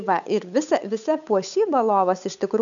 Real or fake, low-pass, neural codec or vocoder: fake; 7.2 kHz; codec, 16 kHz, 8 kbps, FunCodec, trained on Chinese and English, 25 frames a second